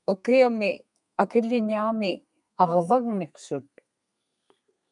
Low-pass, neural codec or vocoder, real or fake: 10.8 kHz; codec, 32 kHz, 1.9 kbps, SNAC; fake